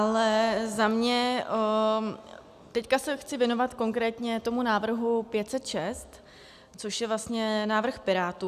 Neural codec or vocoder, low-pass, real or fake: none; 14.4 kHz; real